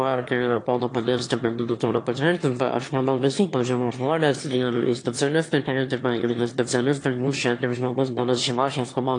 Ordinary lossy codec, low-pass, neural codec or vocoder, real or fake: AAC, 48 kbps; 9.9 kHz; autoencoder, 22.05 kHz, a latent of 192 numbers a frame, VITS, trained on one speaker; fake